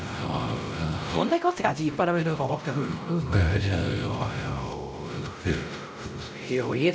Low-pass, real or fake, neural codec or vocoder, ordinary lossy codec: none; fake; codec, 16 kHz, 0.5 kbps, X-Codec, WavLM features, trained on Multilingual LibriSpeech; none